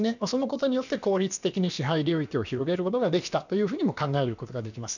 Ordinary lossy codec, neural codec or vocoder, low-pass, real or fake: none; codec, 16 kHz, about 1 kbps, DyCAST, with the encoder's durations; 7.2 kHz; fake